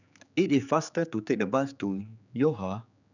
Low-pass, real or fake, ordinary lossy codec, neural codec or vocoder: 7.2 kHz; fake; none; codec, 16 kHz, 4 kbps, X-Codec, HuBERT features, trained on general audio